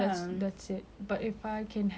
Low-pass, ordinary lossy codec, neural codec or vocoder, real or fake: none; none; none; real